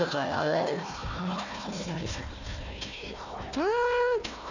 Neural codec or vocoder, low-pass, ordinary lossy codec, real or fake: codec, 16 kHz, 1 kbps, FunCodec, trained on Chinese and English, 50 frames a second; 7.2 kHz; none; fake